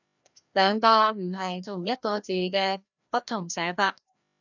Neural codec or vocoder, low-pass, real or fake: codec, 16 kHz, 1 kbps, FreqCodec, larger model; 7.2 kHz; fake